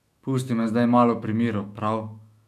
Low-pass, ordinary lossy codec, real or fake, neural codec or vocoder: 14.4 kHz; none; fake; autoencoder, 48 kHz, 128 numbers a frame, DAC-VAE, trained on Japanese speech